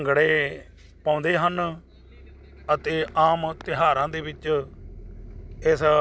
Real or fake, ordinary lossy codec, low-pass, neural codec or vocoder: real; none; none; none